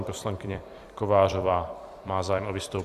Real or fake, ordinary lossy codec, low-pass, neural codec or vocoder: fake; Opus, 64 kbps; 14.4 kHz; vocoder, 44.1 kHz, 128 mel bands every 256 samples, BigVGAN v2